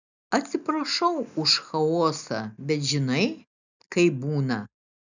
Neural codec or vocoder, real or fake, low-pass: none; real; 7.2 kHz